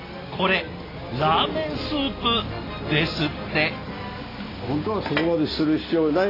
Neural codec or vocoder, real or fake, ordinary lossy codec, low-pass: none; real; AAC, 24 kbps; 5.4 kHz